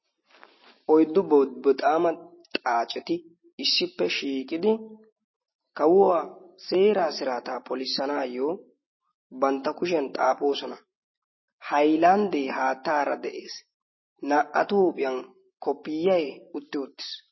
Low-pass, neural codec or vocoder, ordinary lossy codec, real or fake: 7.2 kHz; none; MP3, 24 kbps; real